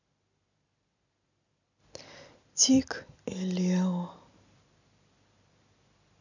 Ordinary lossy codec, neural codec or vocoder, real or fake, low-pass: none; none; real; 7.2 kHz